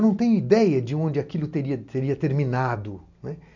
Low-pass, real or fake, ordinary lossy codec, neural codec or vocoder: 7.2 kHz; real; none; none